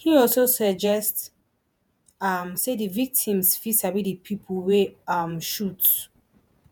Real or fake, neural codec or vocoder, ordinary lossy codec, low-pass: fake; vocoder, 48 kHz, 128 mel bands, Vocos; none; none